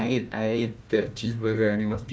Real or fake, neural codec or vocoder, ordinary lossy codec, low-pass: fake; codec, 16 kHz, 1 kbps, FunCodec, trained on Chinese and English, 50 frames a second; none; none